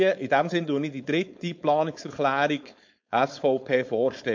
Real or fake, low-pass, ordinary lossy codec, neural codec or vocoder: fake; 7.2 kHz; MP3, 48 kbps; codec, 16 kHz, 4.8 kbps, FACodec